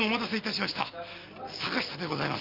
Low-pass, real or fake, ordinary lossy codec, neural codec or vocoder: 5.4 kHz; real; Opus, 32 kbps; none